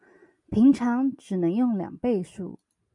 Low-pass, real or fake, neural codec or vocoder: 10.8 kHz; real; none